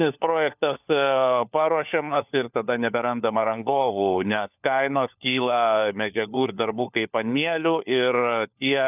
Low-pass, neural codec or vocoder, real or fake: 3.6 kHz; codec, 16 kHz, 4 kbps, FunCodec, trained on LibriTTS, 50 frames a second; fake